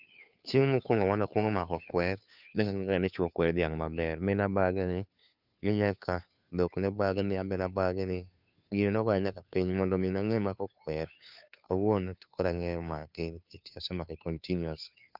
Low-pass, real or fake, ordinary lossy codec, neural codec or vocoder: 5.4 kHz; fake; none; codec, 16 kHz, 2 kbps, FunCodec, trained on Chinese and English, 25 frames a second